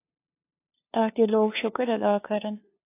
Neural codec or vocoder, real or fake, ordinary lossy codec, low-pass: codec, 16 kHz, 2 kbps, FunCodec, trained on LibriTTS, 25 frames a second; fake; AAC, 24 kbps; 3.6 kHz